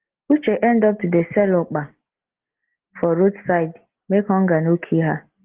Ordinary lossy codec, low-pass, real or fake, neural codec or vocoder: Opus, 16 kbps; 3.6 kHz; real; none